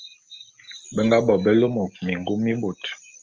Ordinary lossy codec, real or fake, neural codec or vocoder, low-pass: Opus, 24 kbps; real; none; 7.2 kHz